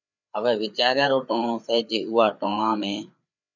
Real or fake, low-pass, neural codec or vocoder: fake; 7.2 kHz; codec, 16 kHz, 4 kbps, FreqCodec, larger model